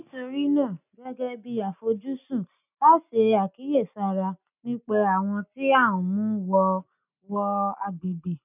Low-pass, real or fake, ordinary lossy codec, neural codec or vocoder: 3.6 kHz; real; none; none